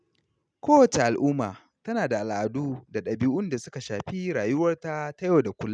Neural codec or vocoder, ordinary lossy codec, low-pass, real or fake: none; none; 9.9 kHz; real